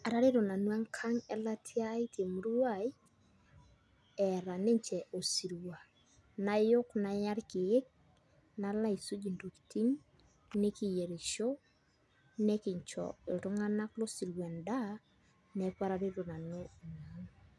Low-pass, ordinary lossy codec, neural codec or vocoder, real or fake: none; none; none; real